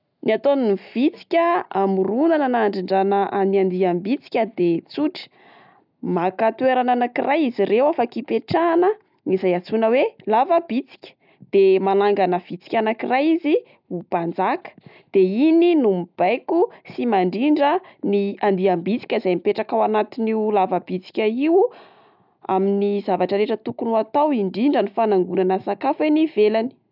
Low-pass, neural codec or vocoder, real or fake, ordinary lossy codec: 5.4 kHz; none; real; none